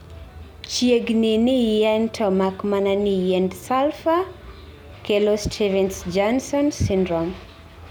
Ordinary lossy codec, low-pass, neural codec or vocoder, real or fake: none; none; none; real